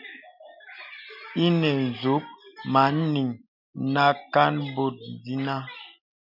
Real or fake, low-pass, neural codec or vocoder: real; 5.4 kHz; none